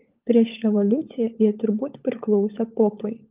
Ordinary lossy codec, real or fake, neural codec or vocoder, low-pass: Opus, 32 kbps; fake; codec, 16 kHz, 16 kbps, FunCodec, trained on LibriTTS, 50 frames a second; 3.6 kHz